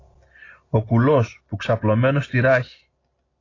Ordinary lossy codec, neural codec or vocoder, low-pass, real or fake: AAC, 32 kbps; none; 7.2 kHz; real